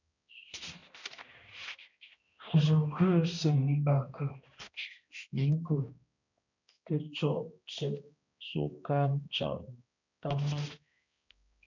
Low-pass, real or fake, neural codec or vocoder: 7.2 kHz; fake; codec, 16 kHz, 1 kbps, X-Codec, HuBERT features, trained on balanced general audio